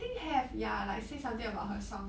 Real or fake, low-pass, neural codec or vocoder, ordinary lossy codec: real; none; none; none